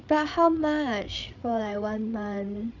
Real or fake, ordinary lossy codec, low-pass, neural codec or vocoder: fake; none; 7.2 kHz; codec, 16 kHz, 8 kbps, FreqCodec, larger model